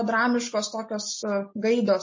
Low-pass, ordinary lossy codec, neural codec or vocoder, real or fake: 7.2 kHz; MP3, 32 kbps; none; real